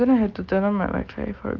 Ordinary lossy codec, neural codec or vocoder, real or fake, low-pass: Opus, 24 kbps; none; real; 7.2 kHz